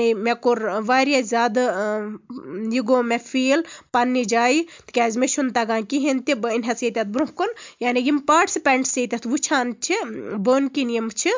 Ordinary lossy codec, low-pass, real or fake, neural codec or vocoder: MP3, 64 kbps; 7.2 kHz; real; none